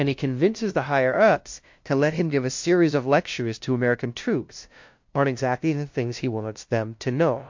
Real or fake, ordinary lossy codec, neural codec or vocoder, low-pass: fake; MP3, 48 kbps; codec, 16 kHz, 0.5 kbps, FunCodec, trained on LibriTTS, 25 frames a second; 7.2 kHz